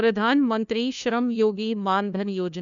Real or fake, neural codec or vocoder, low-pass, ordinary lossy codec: fake; codec, 16 kHz, 1 kbps, FunCodec, trained on LibriTTS, 50 frames a second; 7.2 kHz; none